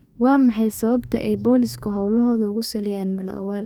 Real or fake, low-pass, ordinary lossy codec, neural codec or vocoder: fake; 19.8 kHz; none; codec, 44.1 kHz, 2.6 kbps, DAC